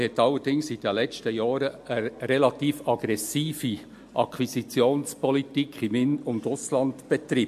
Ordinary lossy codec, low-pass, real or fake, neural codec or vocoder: MP3, 64 kbps; 14.4 kHz; real; none